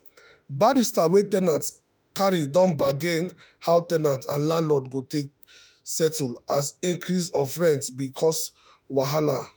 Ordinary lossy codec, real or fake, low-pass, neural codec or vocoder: none; fake; none; autoencoder, 48 kHz, 32 numbers a frame, DAC-VAE, trained on Japanese speech